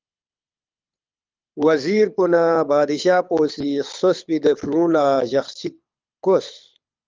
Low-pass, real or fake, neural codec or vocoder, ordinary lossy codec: 7.2 kHz; fake; codec, 24 kHz, 6 kbps, HILCodec; Opus, 24 kbps